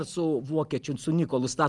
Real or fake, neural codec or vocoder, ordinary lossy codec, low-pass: real; none; Opus, 32 kbps; 10.8 kHz